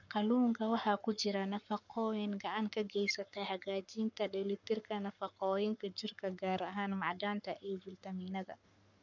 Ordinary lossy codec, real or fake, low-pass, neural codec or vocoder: none; fake; 7.2 kHz; codec, 44.1 kHz, 7.8 kbps, Pupu-Codec